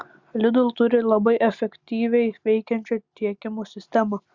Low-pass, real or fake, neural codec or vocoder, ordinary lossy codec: 7.2 kHz; real; none; Opus, 64 kbps